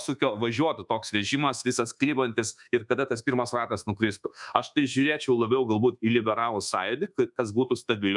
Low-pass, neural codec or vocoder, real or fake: 10.8 kHz; codec, 24 kHz, 1.2 kbps, DualCodec; fake